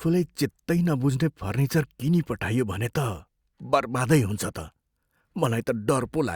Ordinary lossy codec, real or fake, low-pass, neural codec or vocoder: Opus, 64 kbps; real; 19.8 kHz; none